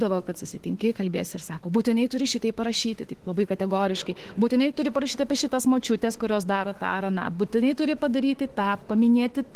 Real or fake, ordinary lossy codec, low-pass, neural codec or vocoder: fake; Opus, 16 kbps; 14.4 kHz; autoencoder, 48 kHz, 32 numbers a frame, DAC-VAE, trained on Japanese speech